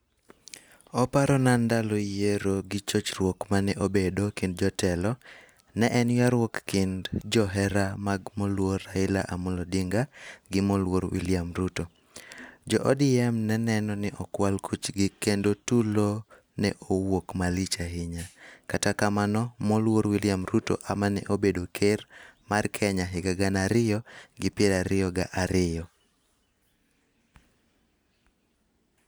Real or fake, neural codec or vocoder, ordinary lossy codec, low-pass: real; none; none; none